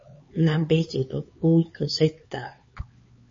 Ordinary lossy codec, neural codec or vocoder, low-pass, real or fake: MP3, 32 kbps; codec, 16 kHz, 4 kbps, X-Codec, HuBERT features, trained on LibriSpeech; 7.2 kHz; fake